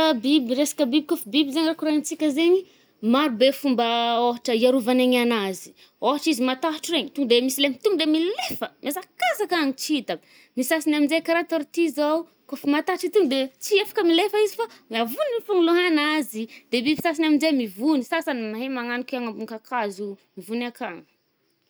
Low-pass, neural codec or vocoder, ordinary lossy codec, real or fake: none; none; none; real